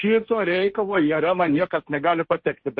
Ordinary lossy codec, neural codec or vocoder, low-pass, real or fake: MP3, 32 kbps; codec, 16 kHz, 1.1 kbps, Voila-Tokenizer; 7.2 kHz; fake